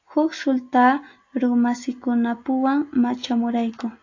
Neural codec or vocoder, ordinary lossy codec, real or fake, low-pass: none; AAC, 48 kbps; real; 7.2 kHz